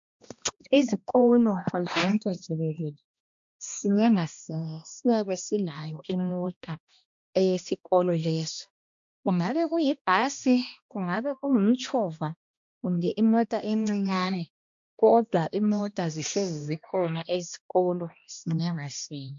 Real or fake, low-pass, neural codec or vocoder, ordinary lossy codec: fake; 7.2 kHz; codec, 16 kHz, 1 kbps, X-Codec, HuBERT features, trained on balanced general audio; MP3, 64 kbps